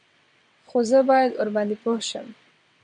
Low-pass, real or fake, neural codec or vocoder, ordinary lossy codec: 9.9 kHz; fake; vocoder, 22.05 kHz, 80 mel bands, WaveNeXt; MP3, 64 kbps